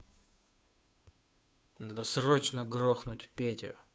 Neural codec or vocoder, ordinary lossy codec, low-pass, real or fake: codec, 16 kHz, 2 kbps, FunCodec, trained on Chinese and English, 25 frames a second; none; none; fake